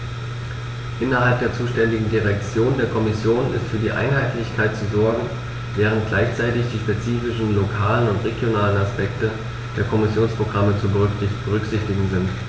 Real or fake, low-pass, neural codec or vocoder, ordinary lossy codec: real; none; none; none